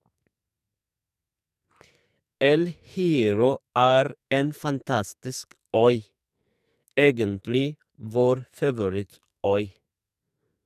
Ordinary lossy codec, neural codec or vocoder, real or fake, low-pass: none; codec, 44.1 kHz, 2.6 kbps, SNAC; fake; 14.4 kHz